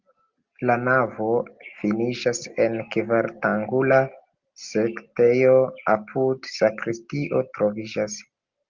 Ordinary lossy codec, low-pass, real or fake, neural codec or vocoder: Opus, 32 kbps; 7.2 kHz; real; none